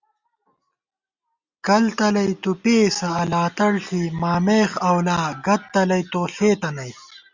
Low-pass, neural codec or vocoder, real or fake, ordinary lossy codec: 7.2 kHz; none; real; Opus, 64 kbps